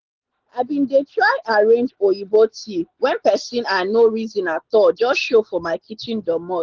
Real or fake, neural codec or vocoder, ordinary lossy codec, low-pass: real; none; Opus, 16 kbps; 7.2 kHz